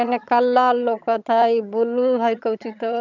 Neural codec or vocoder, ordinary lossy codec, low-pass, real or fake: vocoder, 22.05 kHz, 80 mel bands, HiFi-GAN; none; 7.2 kHz; fake